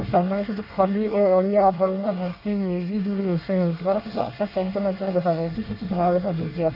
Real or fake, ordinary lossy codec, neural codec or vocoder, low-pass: fake; none; codec, 24 kHz, 1 kbps, SNAC; 5.4 kHz